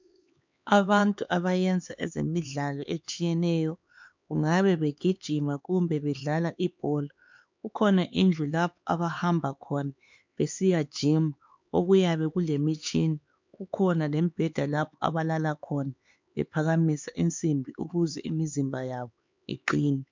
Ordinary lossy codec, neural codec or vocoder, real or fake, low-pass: MP3, 64 kbps; codec, 16 kHz, 4 kbps, X-Codec, HuBERT features, trained on LibriSpeech; fake; 7.2 kHz